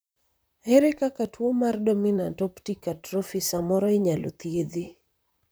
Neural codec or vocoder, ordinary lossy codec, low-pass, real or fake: vocoder, 44.1 kHz, 128 mel bands, Pupu-Vocoder; none; none; fake